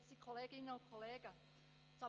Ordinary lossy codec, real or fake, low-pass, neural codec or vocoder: Opus, 24 kbps; real; 7.2 kHz; none